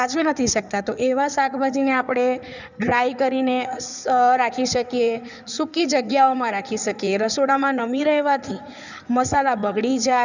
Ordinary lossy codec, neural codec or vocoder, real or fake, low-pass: none; codec, 16 kHz in and 24 kHz out, 2.2 kbps, FireRedTTS-2 codec; fake; 7.2 kHz